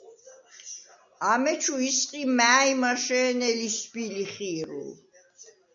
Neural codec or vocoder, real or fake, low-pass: none; real; 7.2 kHz